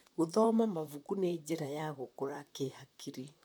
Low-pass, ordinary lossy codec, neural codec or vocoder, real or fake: none; none; vocoder, 44.1 kHz, 128 mel bands, Pupu-Vocoder; fake